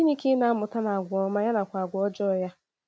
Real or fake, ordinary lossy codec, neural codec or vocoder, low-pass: real; none; none; none